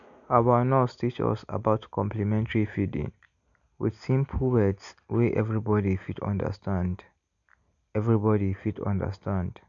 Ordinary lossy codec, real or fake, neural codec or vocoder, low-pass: none; real; none; 7.2 kHz